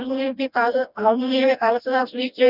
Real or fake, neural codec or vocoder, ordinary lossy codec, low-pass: fake; codec, 16 kHz, 1 kbps, FreqCodec, smaller model; none; 5.4 kHz